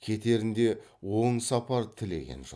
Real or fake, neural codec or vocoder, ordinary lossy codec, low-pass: real; none; none; none